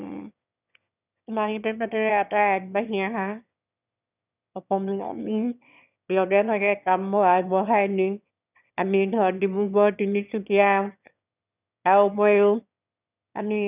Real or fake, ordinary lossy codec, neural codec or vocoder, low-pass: fake; none; autoencoder, 22.05 kHz, a latent of 192 numbers a frame, VITS, trained on one speaker; 3.6 kHz